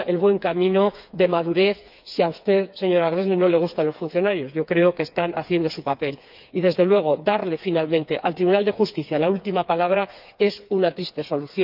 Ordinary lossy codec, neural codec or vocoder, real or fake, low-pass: none; codec, 16 kHz, 4 kbps, FreqCodec, smaller model; fake; 5.4 kHz